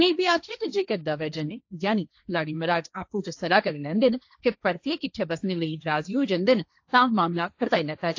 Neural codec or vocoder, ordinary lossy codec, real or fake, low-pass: codec, 16 kHz, 1.1 kbps, Voila-Tokenizer; AAC, 48 kbps; fake; 7.2 kHz